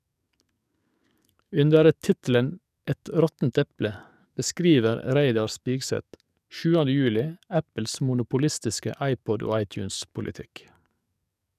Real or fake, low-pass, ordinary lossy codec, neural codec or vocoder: fake; 14.4 kHz; none; codec, 44.1 kHz, 7.8 kbps, DAC